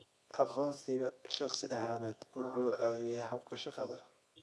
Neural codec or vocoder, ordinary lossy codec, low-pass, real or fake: codec, 24 kHz, 0.9 kbps, WavTokenizer, medium music audio release; none; none; fake